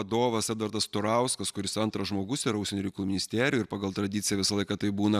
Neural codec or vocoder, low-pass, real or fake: none; 14.4 kHz; real